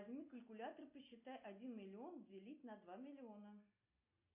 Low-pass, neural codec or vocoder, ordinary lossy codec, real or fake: 3.6 kHz; none; MP3, 24 kbps; real